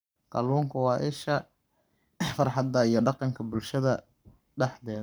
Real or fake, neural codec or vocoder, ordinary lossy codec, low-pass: fake; codec, 44.1 kHz, 7.8 kbps, Pupu-Codec; none; none